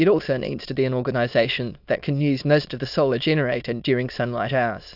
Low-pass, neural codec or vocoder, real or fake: 5.4 kHz; autoencoder, 22.05 kHz, a latent of 192 numbers a frame, VITS, trained on many speakers; fake